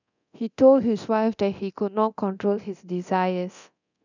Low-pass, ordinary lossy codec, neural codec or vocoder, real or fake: 7.2 kHz; none; codec, 24 kHz, 0.9 kbps, DualCodec; fake